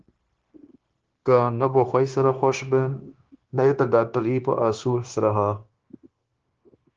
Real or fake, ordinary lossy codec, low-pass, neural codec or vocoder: fake; Opus, 16 kbps; 7.2 kHz; codec, 16 kHz, 0.9 kbps, LongCat-Audio-Codec